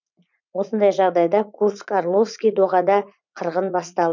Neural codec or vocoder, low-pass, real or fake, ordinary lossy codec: vocoder, 44.1 kHz, 80 mel bands, Vocos; 7.2 kHz; fake; none